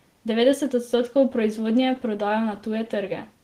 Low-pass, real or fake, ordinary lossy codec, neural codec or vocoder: 14.4 kHz; real; Opus, 16 kbps; none